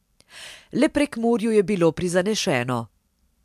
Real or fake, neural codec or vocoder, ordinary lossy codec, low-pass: real; none; MP3, 96 kbps; 14.4 kHz